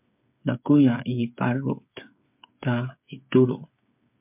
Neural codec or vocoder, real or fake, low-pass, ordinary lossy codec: codec, 16 kHz, 8 kbps, FreqCodec, smaller model; fake; 3.6 kHz; MP3, 32 kbps